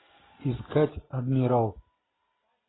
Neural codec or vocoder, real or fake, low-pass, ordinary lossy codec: none; real; 7.2 kHz; AAC, 16 kbps